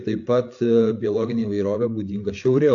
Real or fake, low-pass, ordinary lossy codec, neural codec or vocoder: fake; 7.2 kHz; AAC, 48 kbps; codec, 16 kHz, 8 kbps, FreqCodec, larger model